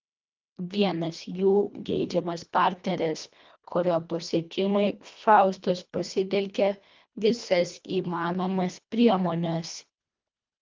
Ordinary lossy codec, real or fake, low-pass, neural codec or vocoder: Opus, 24 kbps; fake; 7.2 kHz; codec, 24 kHz, 1.5 kbps, HILCodec